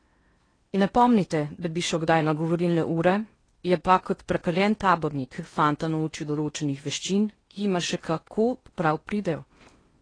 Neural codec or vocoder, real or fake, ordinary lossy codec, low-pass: codec, 16 kHz in and 24 kHz out, 0.8 kbps, FocalCodec, streaming, 65536 codes; fake; AAC, 32 kbps; 9.9 kHz